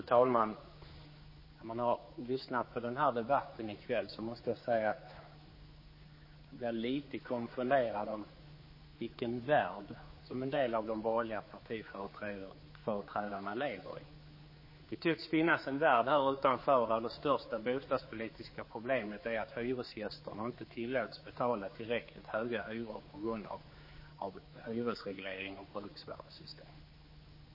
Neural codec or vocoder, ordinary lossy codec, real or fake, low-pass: codec, 16 kHz, 4 kbps, X-Codec, HuBERT features, trained on general audio; MP3, 24 kbps; fake; 5.4 kHz